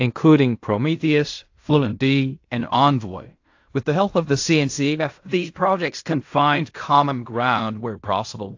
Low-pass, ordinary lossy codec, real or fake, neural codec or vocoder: 7.2 kHz; AAC, 48 kbps; fake; codec, 16 kHz in and 24 kHz out, 0.4 kbps, LongCat-Audio-Codec, fine tuned four codebook decoder